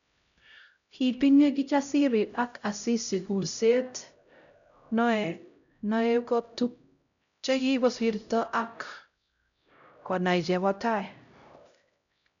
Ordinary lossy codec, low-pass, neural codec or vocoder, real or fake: none; 7.2 kHz; codec, 16 kHz, 0.5 kbps, X-Codec, HuBERT features, trained on LibriSpeech; fake